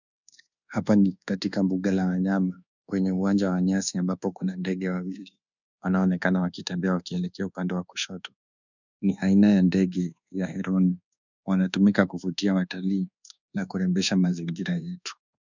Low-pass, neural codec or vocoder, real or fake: 7.2 kHz; codec, 24 kHz, 1.2 kbps, DualCodec; fake